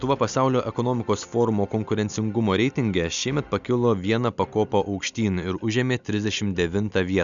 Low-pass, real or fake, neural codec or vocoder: 7.2 kHz; real; none